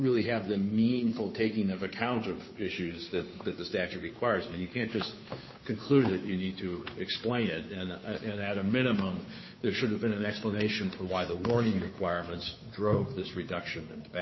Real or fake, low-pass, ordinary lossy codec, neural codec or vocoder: fake; 7.2 kHz; MP3, 24 kbps; codec, 16 kHz, 2 kbps, FunCodec, trained on Chinese and English, 25 frames a second